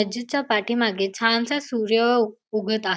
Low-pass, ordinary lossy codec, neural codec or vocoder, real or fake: none; none; none; real